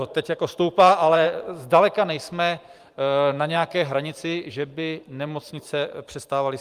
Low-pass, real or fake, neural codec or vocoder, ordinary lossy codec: 14.4 kHz; fake; vocoder, 44.1 kHz, 128 mel bands every 512 samples, BigVGAN v2; Opus, 32 kbps